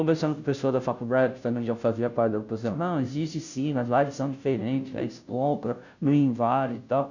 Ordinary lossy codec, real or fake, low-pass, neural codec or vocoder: none; fake; 7.2 kHz; codec, 16 kHz, 0.5 kbps, FunCodec, trained on Chinese and English, 25 frames a second